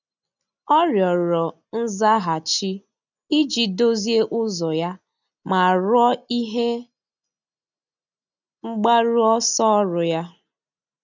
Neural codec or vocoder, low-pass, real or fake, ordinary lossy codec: none; 7.2 kHz; real; none